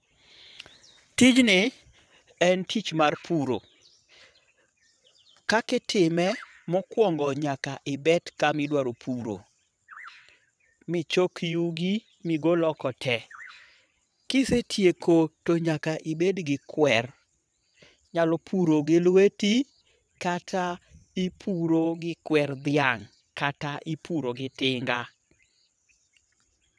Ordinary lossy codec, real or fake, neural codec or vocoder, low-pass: none; fake; vocoder, 22.05 kHz, 80 mel bands, WaveNeXt; none